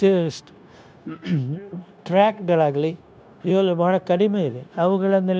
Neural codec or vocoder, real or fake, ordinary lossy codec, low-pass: codec, 16 kHz, 0.9 kbps, LongCat-Audio-Codec; fake; none; none